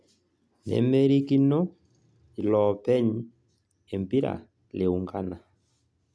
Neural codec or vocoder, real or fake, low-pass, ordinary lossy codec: none; real; none; none